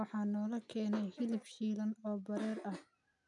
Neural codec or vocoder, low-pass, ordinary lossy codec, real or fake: none; 10.8 kHz; MP3, 96 kbps; real